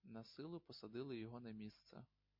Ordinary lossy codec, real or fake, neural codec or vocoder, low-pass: MP3, 32 kbps; real; none; 5.4 kHz